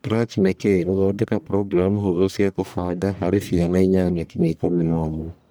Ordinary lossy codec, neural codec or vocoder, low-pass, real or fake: none; codec, 44.1 kHz, 1.7 kbps, Pupu-Codec; none; fake